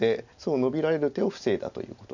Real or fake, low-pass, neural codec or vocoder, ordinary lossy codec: real; 7.2 kHz; none; none